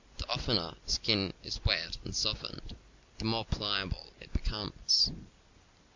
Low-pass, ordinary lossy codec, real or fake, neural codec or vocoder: 7.2 kHz; MP3, 64 kbps; real; none